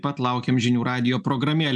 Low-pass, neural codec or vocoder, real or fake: 10.8 kHz; none; real